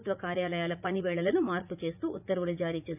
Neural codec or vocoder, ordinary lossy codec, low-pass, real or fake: vocoder, 44.1 kHz, 80 mel bands, Vocos; none; 3.6 kHz; fake